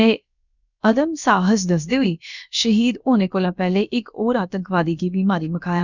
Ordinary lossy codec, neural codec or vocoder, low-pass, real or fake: none; codec, 16 kHz, about 1 kbps, DyCAST, with the encoder's durations; 7.2 kHz; fake